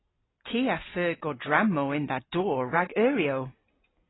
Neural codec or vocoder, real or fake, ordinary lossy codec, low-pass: none; real; AAC, 16 kbps; 7.2 kHz